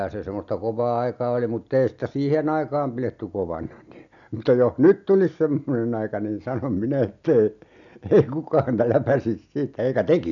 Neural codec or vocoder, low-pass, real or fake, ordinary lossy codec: none; 7.2 kHz; real; none